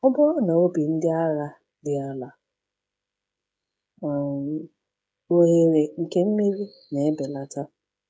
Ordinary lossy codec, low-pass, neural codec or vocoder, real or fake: none; none; codec, 16 kHz, 16 kbps, FreqCodec, smaller model; fake